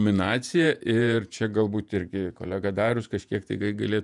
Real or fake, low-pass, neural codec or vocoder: fake; 10.8 kHz; vocoder, 48 kHz, 128 mel bands, Vocos